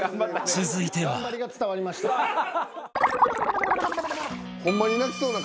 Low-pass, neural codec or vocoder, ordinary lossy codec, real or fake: none; none; none; real